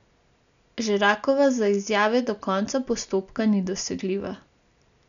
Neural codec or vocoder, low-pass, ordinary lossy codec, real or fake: none; 7.2 kHz; none; real